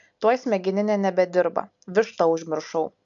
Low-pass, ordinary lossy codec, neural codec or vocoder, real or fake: 7.2 kHz; MP3, 64 kbps; none; real